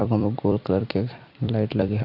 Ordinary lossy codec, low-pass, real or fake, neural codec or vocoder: AAC, 48 kbps; 5.4 kHz; fake; vocoder, 44.1 kHz, 128 mel bands every 256 samples, BigVGAN v2